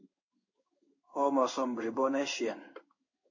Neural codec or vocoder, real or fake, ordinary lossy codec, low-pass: codec, 16 kHz in and 24 kHz out, 1 kbps, XY-Tokenizer; fake; MP3, 32 kbps; 7.2 kHz